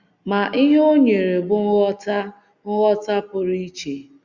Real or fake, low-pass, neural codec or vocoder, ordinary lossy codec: real; 7.2 kHz; none; Opus, 64 kbps